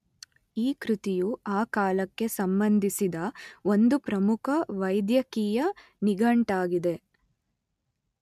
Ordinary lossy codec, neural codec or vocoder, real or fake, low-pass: MP3, 96 kbps; none; real; 14.4 kHz